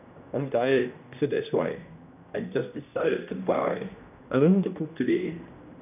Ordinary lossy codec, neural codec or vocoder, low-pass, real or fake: none; codec, 16 kHz, 1 kbps, X-Codec, HuBERT features, trained on general audio; 3.6 kHz; fake